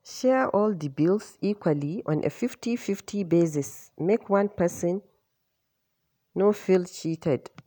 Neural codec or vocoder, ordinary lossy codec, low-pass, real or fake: none; none; none; real